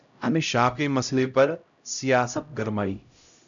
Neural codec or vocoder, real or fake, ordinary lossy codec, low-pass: codec, 16 kHz, 0.5 kbps, X-Codec, HuBERT features, trained on LibriSpeech; fake; MP3, 96 kbps; 7.2 kHz